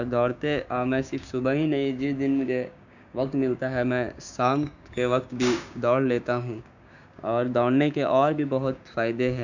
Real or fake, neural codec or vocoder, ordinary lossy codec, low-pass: fake; codec, 16 kHz, 6 kbps, DAC; none; 7.2 kHz